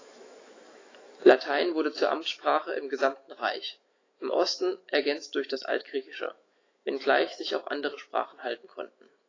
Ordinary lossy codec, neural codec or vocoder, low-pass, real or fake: AAC, 32 kbps; autoencoder, 48 kHz, 128 numbers a frame, DAC-VAE, trained on Japanese speech; 7.2 kHz; fake